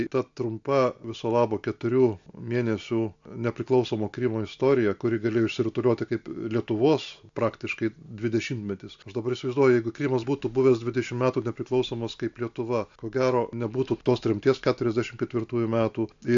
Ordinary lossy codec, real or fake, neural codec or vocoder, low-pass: AAC, 64 kbps; real; none; 7.2 kHz